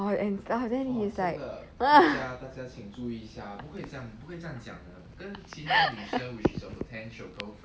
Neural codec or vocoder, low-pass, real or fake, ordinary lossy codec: none; none; real; none